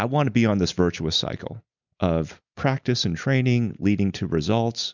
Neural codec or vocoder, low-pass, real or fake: none; 7.2 kHz; real